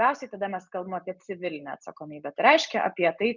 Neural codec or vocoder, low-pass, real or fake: none; 7.2 kHz; real